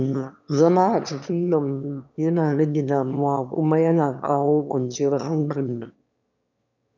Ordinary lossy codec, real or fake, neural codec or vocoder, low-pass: none; fake; autoencoder, 22.05 kHz, a latent of 192 numbers a frame, VITS, trained on one speaker; 7.2 kHz